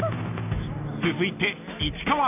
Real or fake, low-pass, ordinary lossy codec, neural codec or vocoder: real; 3.6 kHz; none; none